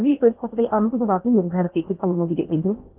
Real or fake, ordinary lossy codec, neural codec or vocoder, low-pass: fake; Opus, 24 kbps; codec, 16 kHz in and 24 kHz out, 0.6 kbps, FocalCodec, streaming, 4096 codes; 3.6 kHz